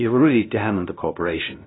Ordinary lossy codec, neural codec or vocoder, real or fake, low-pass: AAC, 16 kbps; codec, 16 kHz, 0.5 kbps, FunCodec, trained on LibriTTS, 25 frames a second; fake; 7.2 kHz